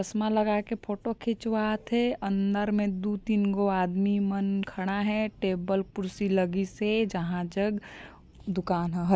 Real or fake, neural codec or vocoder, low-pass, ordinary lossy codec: real; none; none; none